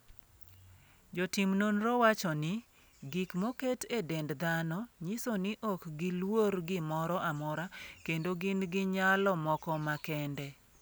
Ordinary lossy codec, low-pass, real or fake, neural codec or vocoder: none; none; real; none